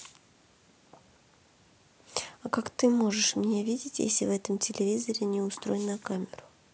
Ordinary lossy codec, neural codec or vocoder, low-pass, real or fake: none; none; none; real